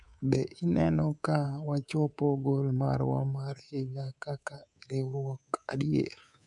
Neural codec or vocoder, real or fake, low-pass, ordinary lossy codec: codec, 24 kHz, 3.1 kbps, DualCodec; fake; 10.8 kHz; none